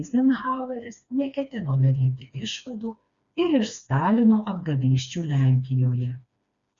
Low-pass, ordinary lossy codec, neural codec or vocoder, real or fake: 7.2 kHz; Opus, 64 kbps; codec, 16 kHz, 2 kbps, FreqCodec, smaller model; fake